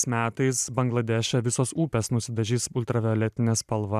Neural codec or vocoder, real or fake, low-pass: vocoder, 44.1 kHz, 128 mel bands every 512 samples, BigVGAN v2; fake; 14.4 kHz